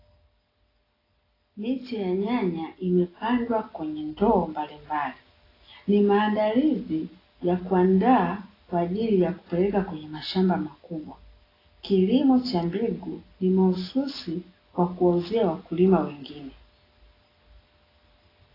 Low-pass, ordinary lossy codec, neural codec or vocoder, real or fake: 5.4 kHz; AAC, 24 kbps; none; real